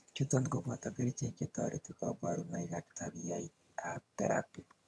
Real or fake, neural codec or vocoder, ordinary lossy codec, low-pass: fake; vocoder, 22.05 kHz, 80 mel bands, HiFi-GAN; none; none